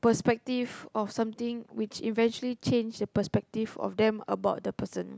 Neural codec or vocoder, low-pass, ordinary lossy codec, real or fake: none; none; none; real